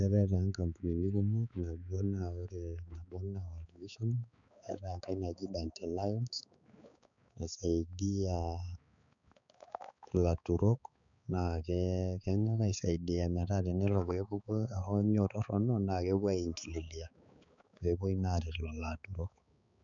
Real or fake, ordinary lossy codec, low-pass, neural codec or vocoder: fake; none; 7.2 kHz; codec, 16 kHz, 4 kbps, X-Codec, HuBERT features, trained on balanced general audio